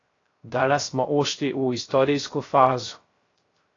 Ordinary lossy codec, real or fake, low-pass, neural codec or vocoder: AAC, 32 kbps; fake; 7.2 kHz; codec, 16 kHz, 0.3 kbps, FocalCodec